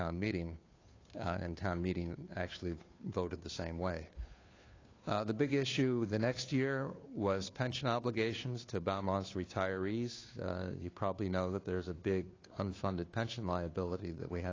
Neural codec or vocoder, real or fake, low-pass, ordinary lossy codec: codec, 16 kHz, 2 kbps, FunCodec, trained on Chinese and English, 25 frames a second; fake; 7.2 kHz; AAC, 32 kbps